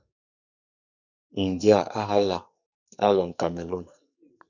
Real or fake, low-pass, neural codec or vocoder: fake; 7.2 kHz; codec, 44.1 kHz, 2.6 kbps, SNAC